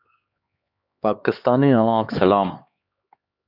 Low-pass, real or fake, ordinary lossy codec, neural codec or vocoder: 5.4 kHz; fake; Opus, 64 kbps; codec, 16 kHz, 2 kbps, X-Codec, HuBERT features, trained on LibriSpeech